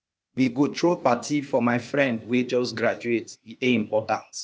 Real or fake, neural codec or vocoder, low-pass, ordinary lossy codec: fake; codec, 16 kHz, 0.8 kbps, ZipCodec; none; none